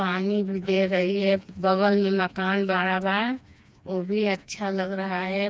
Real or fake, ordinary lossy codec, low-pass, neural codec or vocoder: fake; none; none; codec, 16 kHz, 2 kbps, FreqCodec, smaller model